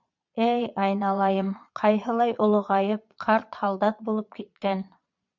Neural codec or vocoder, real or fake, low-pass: vocoder, 22.05 kHz, 80 mel bands, Vocos; fake; 7.2 kHz